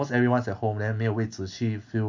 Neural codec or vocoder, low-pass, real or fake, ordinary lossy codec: none; 7.2 kHz; real; MP3, 64 kbps